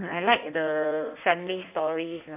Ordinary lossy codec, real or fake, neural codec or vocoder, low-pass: AAC, 32 kbps; fake; codec, 16 kHz in and 24 kHz out, 1.1 kbps, FireRedTTS-2 codec; 3.6 kHz